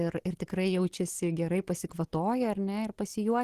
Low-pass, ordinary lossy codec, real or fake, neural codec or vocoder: 14.4 kHz; Opus, 16 kbps; real; none